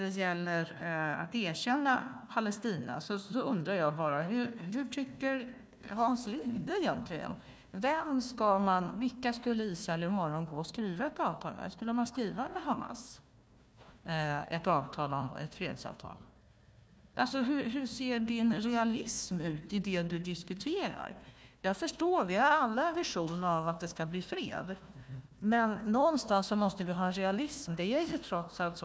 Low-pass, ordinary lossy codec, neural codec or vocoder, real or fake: none; none; codec, 16 kHz, 1 kbps, FunCodec, trained on Chinese and English, 50 frames a second; fake